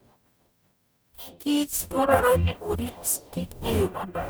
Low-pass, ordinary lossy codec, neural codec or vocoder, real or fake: none; none; codec, 44.1 kHz, 0.9 kbps, DAC; fake